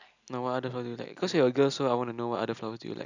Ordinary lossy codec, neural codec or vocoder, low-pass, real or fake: none; none; 7.2 kHz; real